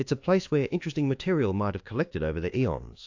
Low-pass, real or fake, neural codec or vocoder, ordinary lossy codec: 7.2 kHz; fake; codec, 24 kHz, 1.2 kbps, DualCodec; AAC, 48 kbps